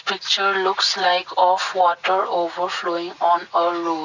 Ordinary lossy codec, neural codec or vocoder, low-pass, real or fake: none; none; 7.2 kHz; real